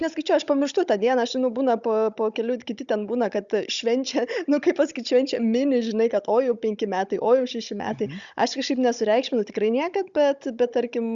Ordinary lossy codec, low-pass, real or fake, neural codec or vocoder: Opus, 64 kbps; 7.2 kHz; fake; codec, 16 kHz, 16 kbps, FunCodec, trained on Chinese and English, 50 frames a second